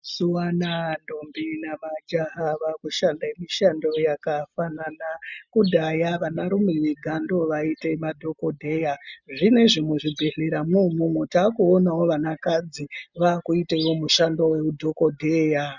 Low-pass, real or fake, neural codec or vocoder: 7.2 kHz; real; none